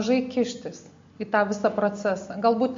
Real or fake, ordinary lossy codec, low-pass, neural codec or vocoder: real; MP3, 48 kbps; 7.2 kHz; none